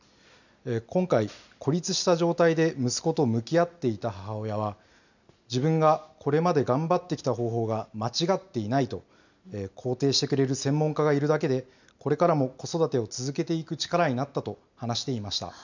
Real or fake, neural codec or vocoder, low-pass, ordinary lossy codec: real; none; 7.2 kHz; none